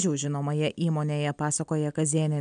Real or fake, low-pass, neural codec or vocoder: real; 9.9 kHz; none